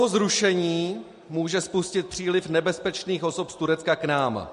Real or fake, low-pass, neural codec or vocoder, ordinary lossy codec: real; 10.8 kHz; none; MP3, 48 kbps